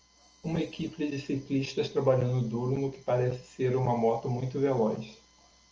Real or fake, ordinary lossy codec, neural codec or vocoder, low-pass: real; Opus, 24 kbps; none; 7.2 kHz